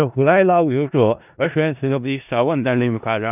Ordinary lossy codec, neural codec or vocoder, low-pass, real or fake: none; codec, 16 kHz in and 24 kHz out, 0.4 kbps, LongCat-Audio-Codec, four codebook decoder; 3.6 kHz; fake